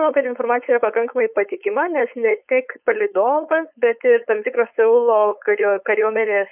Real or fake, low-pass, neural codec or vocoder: fake; 3.6 kHz; codec, 16 kHz, 4.8 kbps, FACodec